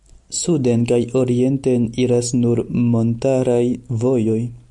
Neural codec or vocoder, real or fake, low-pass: none; real; 10.8 kHz